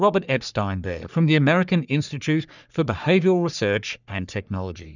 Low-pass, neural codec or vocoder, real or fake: 7.2 kHz; codec, 44.1 kHz, 3.4 kbps, Pupu-Codec; fake